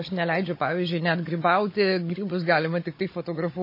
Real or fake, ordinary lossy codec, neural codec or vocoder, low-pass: fake; MP3, 24 kbps; codec, 16 kHz, 16 kbps, FunCodec, trained on Chinese and English, 50 frames a second; 5.4 kHz